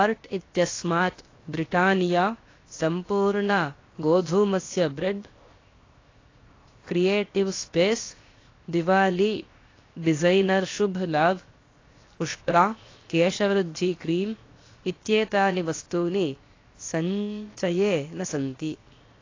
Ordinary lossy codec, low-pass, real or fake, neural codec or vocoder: AAC, 32 kbps; 7.2 kHz; fake; codec, 16 kHz, 0.7 kbps, FocalCodec